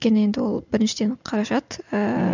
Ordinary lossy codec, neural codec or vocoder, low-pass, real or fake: none; none; 7.2 kHz; real